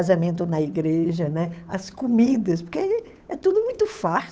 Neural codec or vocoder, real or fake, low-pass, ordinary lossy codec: codec, 16 kHz, 8 kbps, FunCodec, trained on Chinese and English, 25 frames a second; fake; none; none